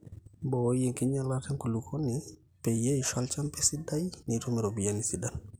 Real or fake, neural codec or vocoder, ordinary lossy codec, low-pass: real; none; none; none